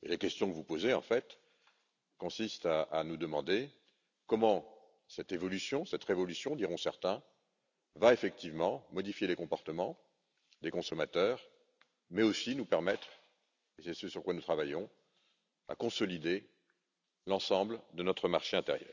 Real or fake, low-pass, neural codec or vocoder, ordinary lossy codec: real; 7.2 kHz; none; none